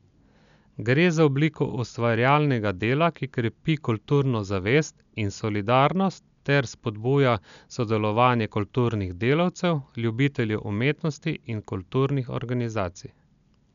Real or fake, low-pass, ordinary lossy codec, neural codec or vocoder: real; 7.2 kHz; none; none